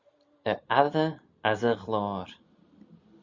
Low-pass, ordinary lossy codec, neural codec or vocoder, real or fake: 7.2 kHz; Opus, 64 kbps; none; real